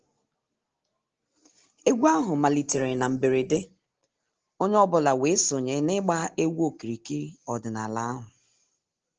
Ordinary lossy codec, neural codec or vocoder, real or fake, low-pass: Opus, 16 kbps; none; real; 7.2 kHz